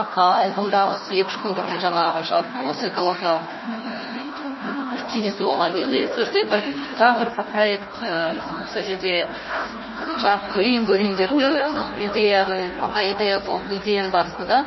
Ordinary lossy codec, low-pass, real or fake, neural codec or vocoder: MP3, 24 kbps; 7.2 kHz; fake; codec, 16 kHz, 1 kbps, FunCodec, trained on Chinese and English, 50 frames a second